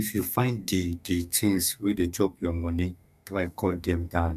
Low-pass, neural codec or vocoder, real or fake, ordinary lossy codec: 14.4 kHz; codec, 32 kHz, 1.9 kbps, SNAC; fake; none